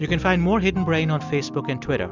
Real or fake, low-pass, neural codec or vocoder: real; 7.2 kHz; none